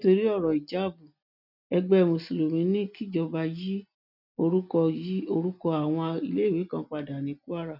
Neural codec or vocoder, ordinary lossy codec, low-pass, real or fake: none; none; 5.4 kHz; real